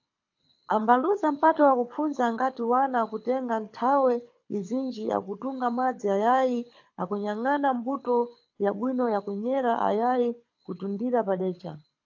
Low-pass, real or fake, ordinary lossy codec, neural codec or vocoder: 7.2 kHz; fake; AAC, 48 kbps; codec, 24 kHz, 6 kbps, HILCodec